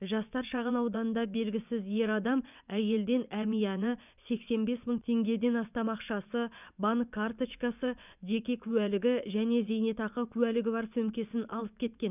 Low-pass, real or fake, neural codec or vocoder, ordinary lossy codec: 3.6 kHz; fake; vocoder, 24 kHz, 100 mel bands, Vocos; none